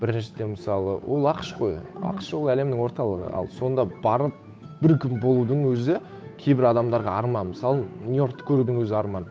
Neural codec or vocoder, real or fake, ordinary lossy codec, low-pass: codec, 16 kHz, 8 kbps, FunCodec, trained on Chinese and English, 25 frames a second; fake; none; none